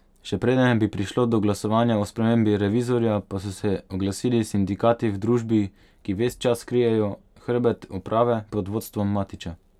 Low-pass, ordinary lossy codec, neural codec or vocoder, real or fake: 19.8 kHz; none; none; real